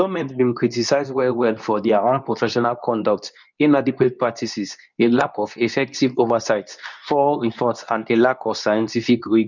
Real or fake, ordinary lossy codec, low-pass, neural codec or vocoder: fake; none; 7.2 kHz; codec, 24 kHz, 0.9 kbps, WavTokenizer, medium speech release version 2